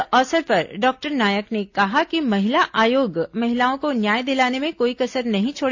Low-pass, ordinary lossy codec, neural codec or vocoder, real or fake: 7.2 kHz; AAC, 48 kbps; none; real